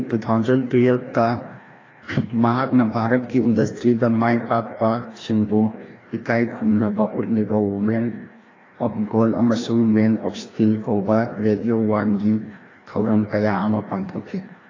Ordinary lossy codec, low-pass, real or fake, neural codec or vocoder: AAC, 32 kbps; 7.2 kHz; fake; codec, 16 kHz, 1 kbps, FreqCodec, larger model